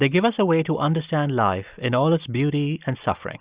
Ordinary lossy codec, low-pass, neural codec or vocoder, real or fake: Opus, 64 kbps; 3.6 kHz; none; real